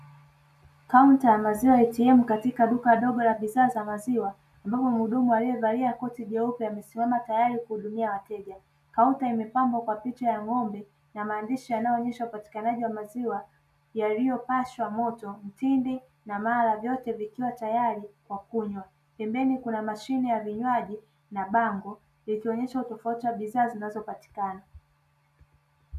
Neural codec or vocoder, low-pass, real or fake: none; 14.4 kHz; real